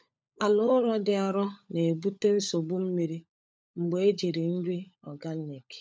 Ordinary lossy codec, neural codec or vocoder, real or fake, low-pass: none; codec, 16 kHz, 16 kbps, FunCodec, trained on LibriTTS, 50 frames a second; fake; none